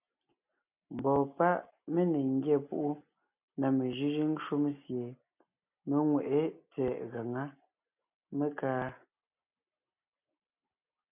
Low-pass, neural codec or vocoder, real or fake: 3.6 kHz; none; real